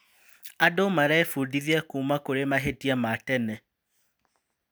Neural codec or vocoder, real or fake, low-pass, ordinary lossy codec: none; real; none; none